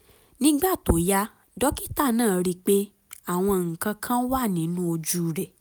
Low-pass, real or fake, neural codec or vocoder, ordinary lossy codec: none; real; none; none